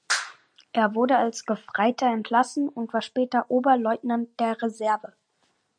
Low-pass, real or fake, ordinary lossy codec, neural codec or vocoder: 9.9 kHz; real; MP3, 96 kbps; none